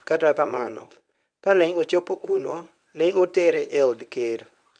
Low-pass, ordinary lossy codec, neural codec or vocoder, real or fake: 9.9 kHz; none; codec, 24 kHz, 0.9 kbps, WavTokenizer, medium speech release version 1; fake